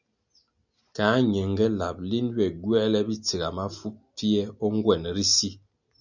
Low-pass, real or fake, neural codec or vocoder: 7.2 kHz; real; none